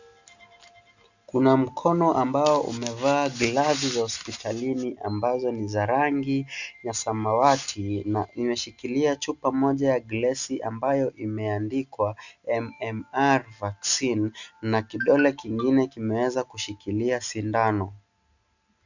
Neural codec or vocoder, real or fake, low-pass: none; real; 7.2 kHz